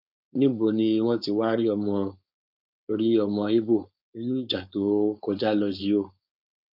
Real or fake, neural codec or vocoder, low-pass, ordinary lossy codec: fake; codec, 16 kHz, 4.8 kbps, FACodec; 5.4 kHz; none